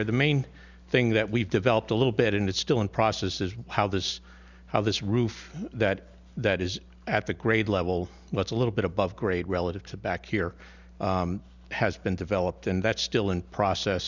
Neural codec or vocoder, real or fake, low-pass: none; real; 7.2 kHz